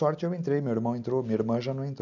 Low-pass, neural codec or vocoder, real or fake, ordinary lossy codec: 7.2 kHz; none; real; none